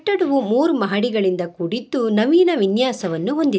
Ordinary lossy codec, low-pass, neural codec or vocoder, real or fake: none; none; none; real